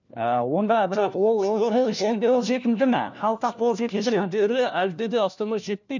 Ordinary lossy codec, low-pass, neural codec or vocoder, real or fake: none; 7.2 kHz; codec, 16 kHz, 1 kbps, FunCodec, trained on LibriTTS, 50 frames a second; fake